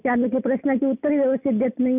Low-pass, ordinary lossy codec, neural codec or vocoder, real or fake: 3.6 kHz; none; none; real